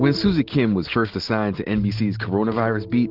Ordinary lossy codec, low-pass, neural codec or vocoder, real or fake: Opus, 16 kbps; 5.4 kHz; none; real